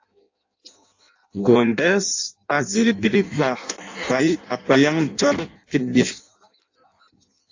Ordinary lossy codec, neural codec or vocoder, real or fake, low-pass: AAC, 48 kbps; codec, 16 kHz in and 24 kHz out, 0.6 kbps, FireRedTTS-2 codec; fake; 7.2 kHz